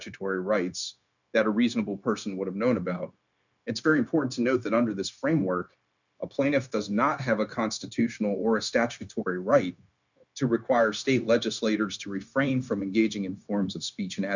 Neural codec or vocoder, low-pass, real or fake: codec, 16 kHz, 0.9 kbps, LongCat-Audio-Codec; 7.2 kHz; fake